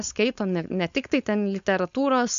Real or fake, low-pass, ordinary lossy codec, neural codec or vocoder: fake; 7.2 kHz; MP3, 64 kbps; codec, 16 kHz, 4.8 kbps, FACodec